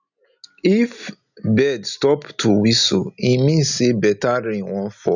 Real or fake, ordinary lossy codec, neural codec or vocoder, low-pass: real; none; none; 7.2 kHz